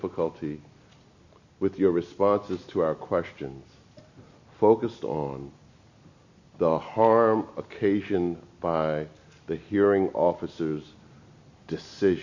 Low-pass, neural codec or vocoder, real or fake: 7.2 kHz; none; real